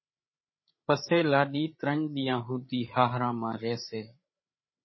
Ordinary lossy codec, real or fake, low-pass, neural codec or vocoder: MP3, 24 kbps; fake; 7.2 kHz; codec, 16 kHz, 8 kbps, FreqCodec, larger model